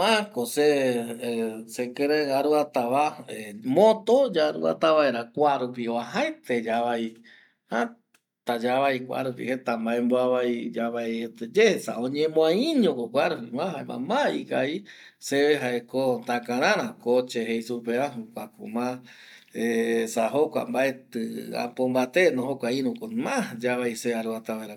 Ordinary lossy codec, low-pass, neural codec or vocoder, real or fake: none; 19.8 kHz; none; real